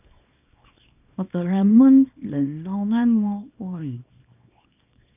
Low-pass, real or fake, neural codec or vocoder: 3.6 kHz; fake; codec, 24 kHz, 0.9 kbps, WavTokenizer, small release